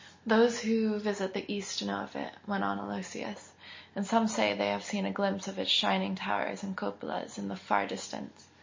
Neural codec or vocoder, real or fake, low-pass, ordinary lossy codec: none; real; 7.2 kHz; MP3, 32 kbps